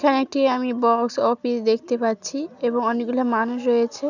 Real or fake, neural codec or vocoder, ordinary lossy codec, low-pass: real; none; none; 7.2 kHz